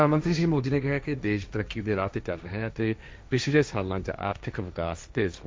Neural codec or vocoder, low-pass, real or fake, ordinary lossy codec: codec, 16 kHz, 1.1 kbps, Voila-Tokenizer; none; fake; none